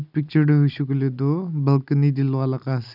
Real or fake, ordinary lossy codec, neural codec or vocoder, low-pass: real; none; none; 5.4 kHz